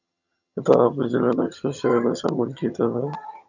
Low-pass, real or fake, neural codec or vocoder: 7.2 kHz; fake; vocoder, 22.05 kHz, 80 mel bands, HiFi-GAN